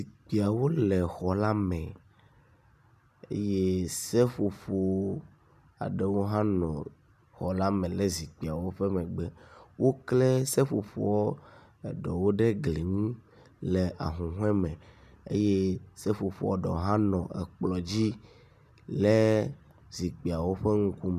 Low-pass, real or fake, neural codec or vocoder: 14.4 kHz; real; none